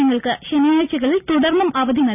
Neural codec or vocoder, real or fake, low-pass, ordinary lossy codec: none; real; 3.6 kHz; none